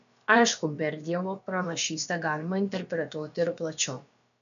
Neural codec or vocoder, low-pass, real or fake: codec, 16 kHz, about 1 kbps, DyCAST, with the encoder's durations; 7.2 kHz; fake